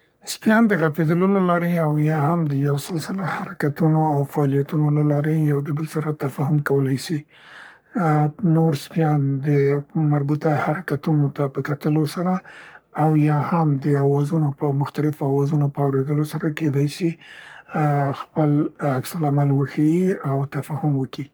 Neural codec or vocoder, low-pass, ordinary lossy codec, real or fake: codec, 44.1 kHz, 3.4 kbps, Pupu-Codec; none; none; fake